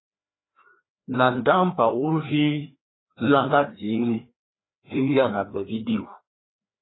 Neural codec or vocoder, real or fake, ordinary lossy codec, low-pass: codec, 16 kHz, 2 kbps, FreqCodec, larger model; fake; AAC, 16 kbps; 7.2 kHz